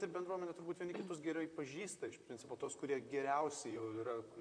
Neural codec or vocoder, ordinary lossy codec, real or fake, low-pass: vocoder, 44.1 kHz, 128 mel bands, Pupu-Vocoder; AAC, 64 kbps; fake; 9.9 kHz